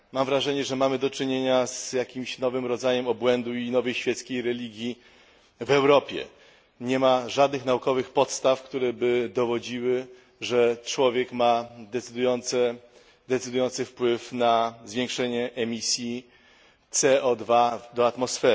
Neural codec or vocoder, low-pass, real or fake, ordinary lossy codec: none; none; real; none